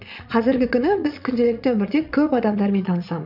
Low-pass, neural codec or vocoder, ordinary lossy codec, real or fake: 5.4 kHz; vocoder, 22.05 kHz, 80 mel bands, Vocos; none; fake